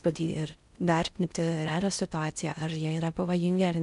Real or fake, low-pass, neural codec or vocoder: fake; 10.8 kHz; codec, 16 kHz in and 24 kHz out, 0.6 kbps, FocalCodec, streaming, 4096 codes